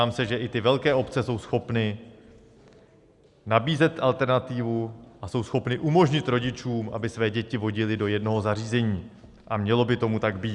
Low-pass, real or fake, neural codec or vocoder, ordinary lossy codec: 10.8 kHz; real; none; Opus, 64 kbps